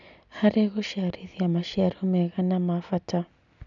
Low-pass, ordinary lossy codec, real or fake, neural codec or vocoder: 7.2 kHz; none; real; none